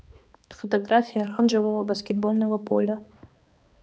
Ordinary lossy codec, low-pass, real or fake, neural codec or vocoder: none; none; fake; codec, 16 kHz, 2 kbps, X-Codec, HuBERT features, trained on balanced general audio